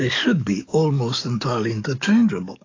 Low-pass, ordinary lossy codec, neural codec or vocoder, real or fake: 7.2 kHz; AAC, 32 kbps; codec, 44.1 kHz, 7.8 kbps, DAC; fake